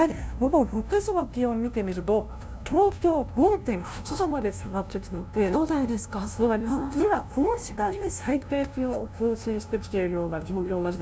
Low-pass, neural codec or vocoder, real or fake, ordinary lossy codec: none; codec, 16 kHz, 0.5 kbps, FunCodec, trained on LibriTTS, 25 frames a second; fake; none